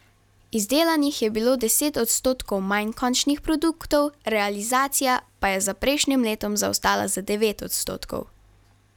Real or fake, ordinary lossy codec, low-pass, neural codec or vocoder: real; none; 19.8 kHz; none